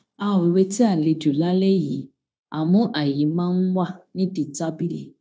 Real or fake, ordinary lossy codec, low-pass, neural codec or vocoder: fake; none; none; codec, 16 kHz, 0.9 kbps, LongCat-Audio-Codec